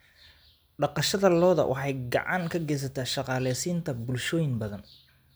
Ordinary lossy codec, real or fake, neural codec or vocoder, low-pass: none; real; none; none